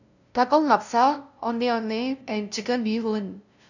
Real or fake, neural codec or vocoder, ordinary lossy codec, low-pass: fake; codec, 16 kHz, 0.5 kbps, FunCodec, trained on LibriTTS, 25 frames a second; none; 7.2 kHz